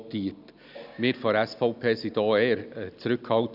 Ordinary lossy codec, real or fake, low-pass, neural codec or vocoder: none; real; 5.4 kHz; none